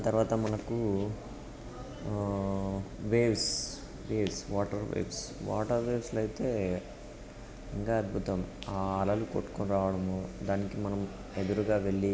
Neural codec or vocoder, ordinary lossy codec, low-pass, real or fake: none; none; none; real